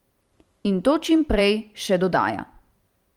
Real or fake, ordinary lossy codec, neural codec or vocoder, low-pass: fake; Opus, 32 kbps; vocoder, 44.1 kHz, 128 mel bands every 512 samples, BigVGAN v2; 19.8 kHz